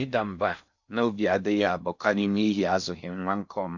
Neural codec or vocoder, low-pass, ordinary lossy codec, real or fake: codec, 16 kHz in and 24 kHz out, 0.6 kbps, FocalCodec, streaming, 4096 codes; 7.2 kHz; none; fake